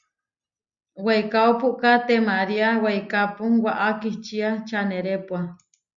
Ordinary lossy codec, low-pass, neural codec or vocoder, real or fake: Opus, 64 kbps; 7.2 kHz; none; real